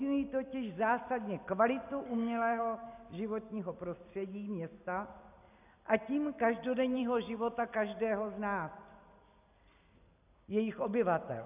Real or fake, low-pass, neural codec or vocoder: real; 3.6 kHz; none